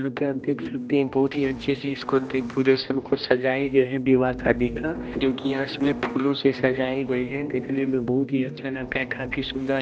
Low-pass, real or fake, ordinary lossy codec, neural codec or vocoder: none; fake; none; codec, 16 kHz, 1 kbps, X-Codec, HuBERT features, trained on general audio